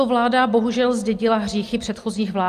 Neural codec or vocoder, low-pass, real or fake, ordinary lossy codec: none; 14.4 kHz; real; Opus, 32 kbps